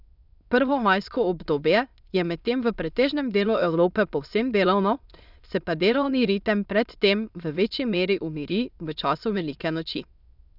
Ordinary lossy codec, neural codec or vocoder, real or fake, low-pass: none; autoencoder, 22.05 kHz, a latent of 192 numbers a frame, VITS, trained on many speakers; fake; 5.4 kHz